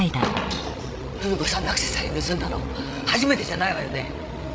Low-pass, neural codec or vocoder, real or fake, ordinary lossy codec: none; codec, 16 kHz, 16 kbps, FreqCodec, larger model; fake; none